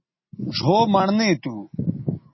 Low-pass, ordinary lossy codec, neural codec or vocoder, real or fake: 7.2 kHz; MP3, 24 kbps; none; real